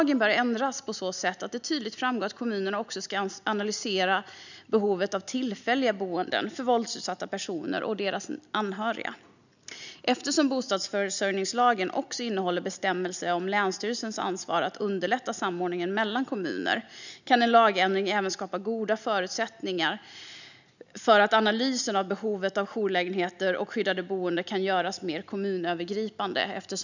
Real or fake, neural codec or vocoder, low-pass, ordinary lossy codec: real; none; 7.2 kHz; none